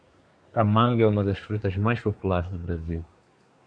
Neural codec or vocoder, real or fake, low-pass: codec, 24 kHz, 1 kbps, SNAC; fake; 9.9 kHz